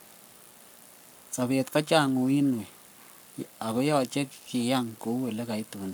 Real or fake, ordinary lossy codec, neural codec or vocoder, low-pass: fake; none; codec, 44.1 kHz, 7.8 kbps, Pupu-Codec; none